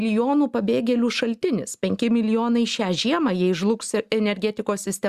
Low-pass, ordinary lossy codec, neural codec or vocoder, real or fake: 14.4 kHz; Opus, 64 kbps; none; real